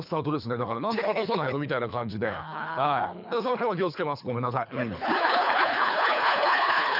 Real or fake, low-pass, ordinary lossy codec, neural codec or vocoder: fake; 5.4 kHz; none; codec, 24 kHz, 3 kbps, HILCodec